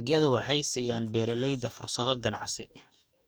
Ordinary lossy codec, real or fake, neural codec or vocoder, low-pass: none; fake; codec, 44.1 kHz, 2.6 kbps, DAC; none